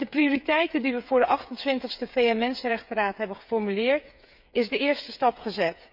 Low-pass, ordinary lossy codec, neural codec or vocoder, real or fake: 5.4 kHz; none; codec, 16 kHz, 8 kbps, FreqCodec, smaller model; fake